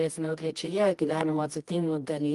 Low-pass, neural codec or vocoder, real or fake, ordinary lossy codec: 10.8 kHz; codec, 24 kHz, 0.9 kbps, WavTokenizer, medium music audio release; fake; Opus, 32 kbps